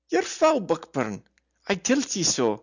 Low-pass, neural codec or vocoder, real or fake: 7.2 kHz; none; real